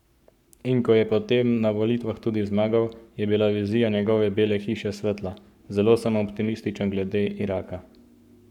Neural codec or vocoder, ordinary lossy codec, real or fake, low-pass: codec, 44.1 kHz, 7.8 kbps, Pupu-Codec; none; fake; 19.8 kHz